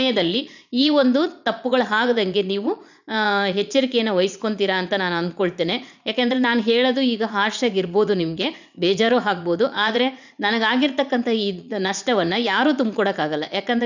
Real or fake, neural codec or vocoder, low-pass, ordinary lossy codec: fake; vocoder, 44.1 kHz, 80 mel bands, Vocos; 7.2 kHz; none